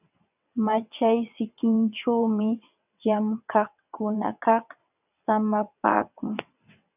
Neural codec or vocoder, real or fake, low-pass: vocoder, 22.05 kHz, 80 mel bands, WaveNeXt; fake; 3.6 kHz